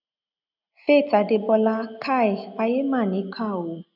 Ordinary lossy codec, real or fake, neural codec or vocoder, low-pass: none; real; none; 5.4 kHz